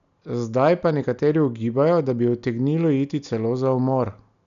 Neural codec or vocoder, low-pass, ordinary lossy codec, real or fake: none; 7.2 kHz; none; real